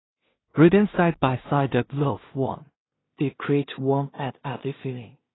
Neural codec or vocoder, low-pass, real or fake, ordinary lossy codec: codec, 16 kHz in and 24 kHz out, 0.4 kbps, LongCat-Audio-Codec, two codebook decoder; 7.2 kHz; fake; AAC, 16 kbps